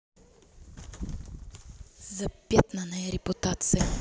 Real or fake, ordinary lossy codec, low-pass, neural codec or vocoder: real; none; none; none